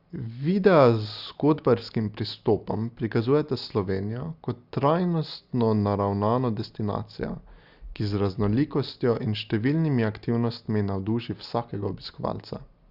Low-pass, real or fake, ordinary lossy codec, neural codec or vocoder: 5.4 kHz; real; Opus, 64 kbps; none